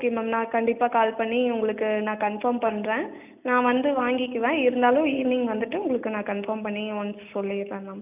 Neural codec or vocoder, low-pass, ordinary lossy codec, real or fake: none; 3.6 kHz; none; real